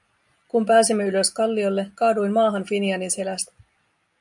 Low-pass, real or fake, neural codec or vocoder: 10.8 kHz; real; none